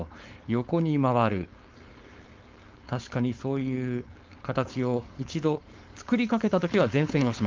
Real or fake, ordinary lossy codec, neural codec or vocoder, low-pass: fake; Opus, 32 kbps; codec, 16 kHz, 4.8 kbps, FACodec; 7.2 kHz